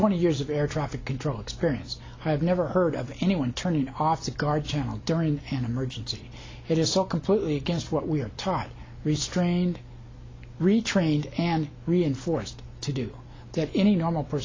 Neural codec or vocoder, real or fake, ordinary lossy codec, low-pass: none; real; AAC, 32 kbps; 7.2 kHz